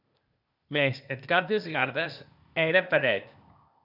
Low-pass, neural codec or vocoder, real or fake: 5.4 kHz; codec, 16 kHz, 0.8 kbps, ZipCodec; fake